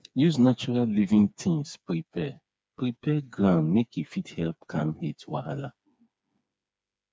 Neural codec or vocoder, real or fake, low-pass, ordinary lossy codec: codec, 16 kHz, 4 kbps, FreqCodec, smaller model; fake; none; none